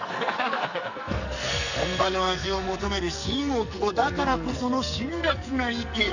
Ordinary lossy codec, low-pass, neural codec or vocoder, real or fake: none; 7.2 kHz; codec, 44.1 kHz, 2.6 kbps, SNAC; fake